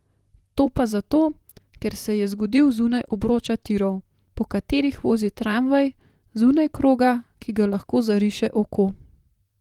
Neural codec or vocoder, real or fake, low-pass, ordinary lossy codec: vocoder, 44.1 kHz, 128 mel bands, Pupu-Vocoder; fake; 19.8 kHz; Opus, 24 kbps